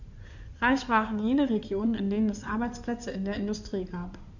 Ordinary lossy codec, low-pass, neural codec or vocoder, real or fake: none; 7.2 kHz; codec, 16 kHz in and 24 kHz out, 2.2 kbps, FireRedTTS-2 codec; fake